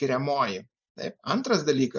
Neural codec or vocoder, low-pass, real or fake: none; 7.2 kHz; real